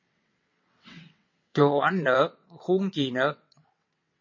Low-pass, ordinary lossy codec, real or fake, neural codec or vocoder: 7.2 kHz; MP3, 32 kbps; fake; vocoder, 22.05 kHz, 80 mel bands, WaveNeXt